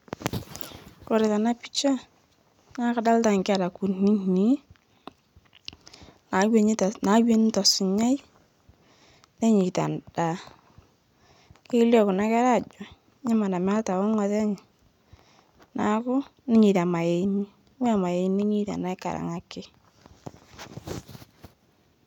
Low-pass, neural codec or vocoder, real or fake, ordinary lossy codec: 19.8 kHz; none; real; none